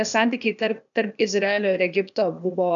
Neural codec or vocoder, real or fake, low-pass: codec, 16 kHz, 0.8 kbps, ZipCodec; fake; 7.2 kHz